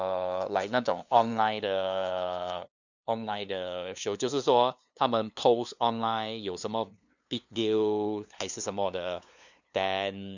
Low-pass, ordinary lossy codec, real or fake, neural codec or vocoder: 7.2 kHz; none; fake; codec, 16 kHz, 2 kbps, FunCodec, trained on LibriTTS, 25 frames a second